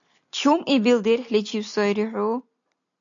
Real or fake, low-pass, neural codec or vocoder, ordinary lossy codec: real; 7.2 kHz; none; AAC, 64 kbps